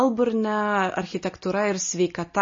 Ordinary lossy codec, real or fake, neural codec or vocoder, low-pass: MP3, 32 kbps; real; none; 7.2 kHz